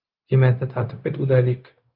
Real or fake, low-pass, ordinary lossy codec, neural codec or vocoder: fake; 5.4 kHz; Opus, 24 kbps; codec, 16 kHz, 0.4 kbps, LongCat-Audio-Codec